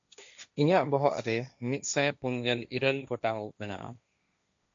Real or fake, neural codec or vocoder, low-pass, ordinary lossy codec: fake; codec, 16 kHz, 1.1 kbps, Voila-Tokenizer; 7.2 kHz; AAC, 64 kbps